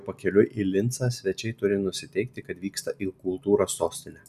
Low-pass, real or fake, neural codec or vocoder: 14.4 kHz; real; none